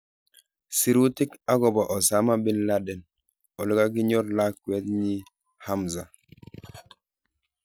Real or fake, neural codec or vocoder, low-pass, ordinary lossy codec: real; none; none; none